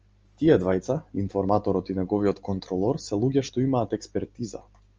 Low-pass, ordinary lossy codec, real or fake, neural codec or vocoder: 7.2 kHz; Opus, 24 kbps; real; none